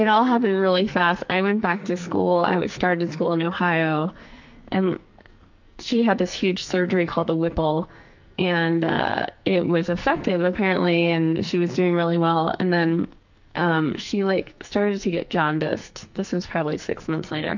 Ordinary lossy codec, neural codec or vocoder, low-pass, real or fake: MP3, 64 kbps; codec, 44.1 kHz, 2.6 kbps, SNAC; 7.2 kHz; fake